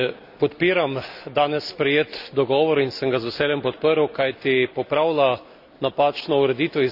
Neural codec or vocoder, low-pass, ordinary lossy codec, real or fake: none; 5.4 kHz; none; real